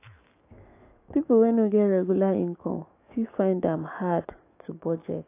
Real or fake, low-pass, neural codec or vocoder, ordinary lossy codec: fake; 3.6 kHz; autoencoder, 48 kHz, 128 numbers a frame, DAC-VAE, trained on Japanese speech; AAC, 24 kbps